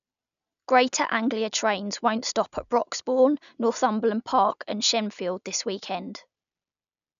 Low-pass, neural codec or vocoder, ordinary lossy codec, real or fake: 7.2 kHz; none; none; real